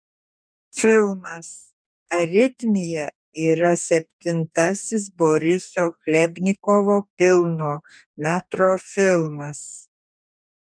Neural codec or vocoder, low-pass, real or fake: codec, 44.1 kHz, 2.6 kbps, DAC; 9.9 kHz; fake